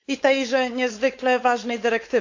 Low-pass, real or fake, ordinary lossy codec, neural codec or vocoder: 7.2 kHz; fake; AAC, 48 kbps; codec, 16 kHz, 4.8 kbps, FACodec